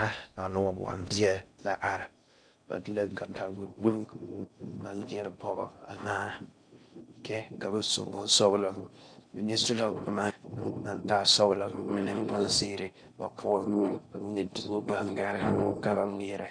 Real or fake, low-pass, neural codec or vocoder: fake; 9.9 kHz; codec, 16 kHz in and 24 kHz out, 0.6 kbps, FocalCodec, streaming, 4096 codes